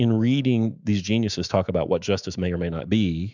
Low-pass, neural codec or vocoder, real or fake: 7.2 kHz; autoencoder, 48 kHz, 128 numbers a frame, DAC-VAE, trained on Japanese speech; fake